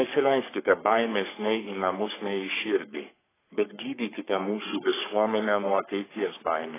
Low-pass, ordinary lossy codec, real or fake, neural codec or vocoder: 3.6 kHz; AAC, 16 kbps; fake; codec, 44.1 kHz, 3.4 kbps, Pupu-Codec